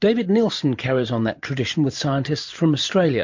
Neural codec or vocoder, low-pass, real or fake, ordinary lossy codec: vocoder, 44.1 kHz, 128 mel bands every 512 samples, BigVGAN v2; 7.2 kHz; fake; MP3, 48 kbps